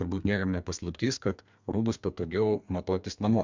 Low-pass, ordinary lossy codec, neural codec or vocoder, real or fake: 7.2 kHz; MP3, 64 kbps; codec, 32 kHz, 1.9 kbps, SNAC; fake